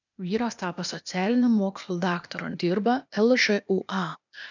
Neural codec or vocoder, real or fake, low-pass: codec, 16 kHz, 0.8 kbps, ZipCodec; fake; 7.2 kHz